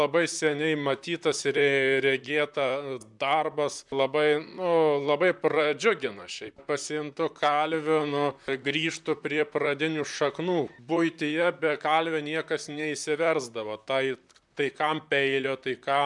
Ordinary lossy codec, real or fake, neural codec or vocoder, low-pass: MP3, 96 kbps; fake; vocoder, 44.1 kHz, 128 mel bands, Pupu-Vocoder; 10.8 kHz